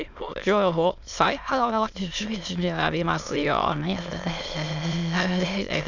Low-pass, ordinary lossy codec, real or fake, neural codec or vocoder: 7.2 kHz; none; fake; autoencoder, 22.05 kHz, a latent of 192 numbers a frame, VITS, trained on many speakers